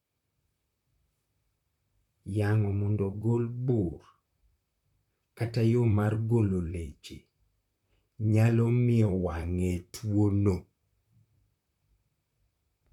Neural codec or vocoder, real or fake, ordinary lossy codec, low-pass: vocoder, 44.1 kHz, 128 mel bands, Pupu-Vocoder; fake; none; 19.8 kHz